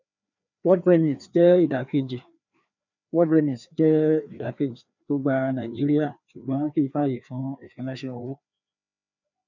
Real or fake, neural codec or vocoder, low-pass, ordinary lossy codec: fake; codec, 16 kHz, 2 kbps, FreqCodec, larger model; 7.2 kHz; none